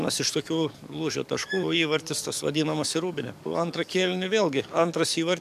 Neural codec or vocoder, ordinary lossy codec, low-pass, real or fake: codec, 44.1 kHz, 7.8 kbps, DAC; MP3, 96 kbps; 14.4 kHz; fake